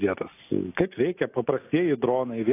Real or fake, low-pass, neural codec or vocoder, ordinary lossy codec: real; 3.6 kHz; none; AAC, 24 kbps